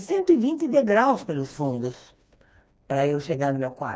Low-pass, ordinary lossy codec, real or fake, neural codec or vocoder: none; none; fake; codec, 16 kHz, 2 kbps, FreqCodec, smaller model